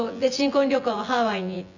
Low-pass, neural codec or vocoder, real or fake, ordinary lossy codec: 7.2 kHz; vocoder, 24 kHz, 100 mel bands, Vocos; fake; none